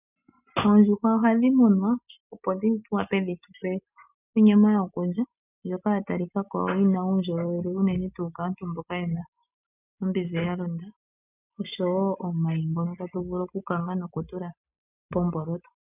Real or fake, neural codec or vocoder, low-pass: real; none; 3.6 kHz